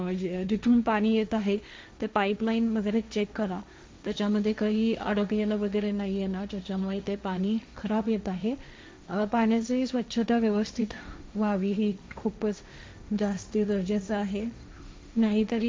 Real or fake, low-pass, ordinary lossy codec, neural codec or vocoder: fake; none; none; codec, 16 kHz, 1.1 kbps, Voila-Tokenizer